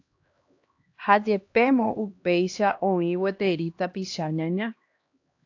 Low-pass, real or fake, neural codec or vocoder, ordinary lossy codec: 7.2 kHz; fake; codec, 16 kHz, 1 kbps, X-Codec, HuBERT features, trained on LibriSpeech; AAC, 48 kbps